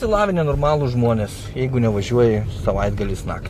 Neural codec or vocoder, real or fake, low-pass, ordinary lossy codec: none; real; 14.4 kHz; AAC, 64 kbps